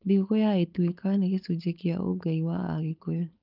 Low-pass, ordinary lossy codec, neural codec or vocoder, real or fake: 5.4 kHz; Opus, 24 kbps; codec, 16 kHz, 4.8 kbps, FACodec; fake